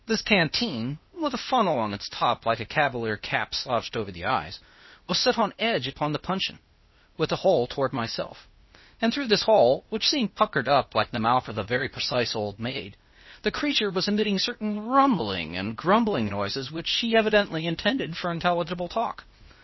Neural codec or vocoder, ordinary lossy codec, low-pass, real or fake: codec, 16 kHz, 0.8 kbps, ZipCodec; MP3, 24 kbps; 7.2 kHz; fake